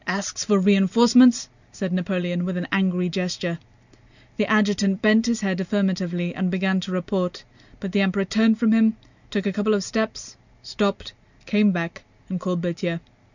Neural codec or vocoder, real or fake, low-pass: none; real; 7.2 kHz